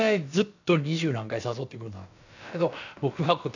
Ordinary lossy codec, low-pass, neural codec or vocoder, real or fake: none; 7.2 kHz; codec, 16 kHz, about 1 kbps, DyCAST, with the encoder's durations; fake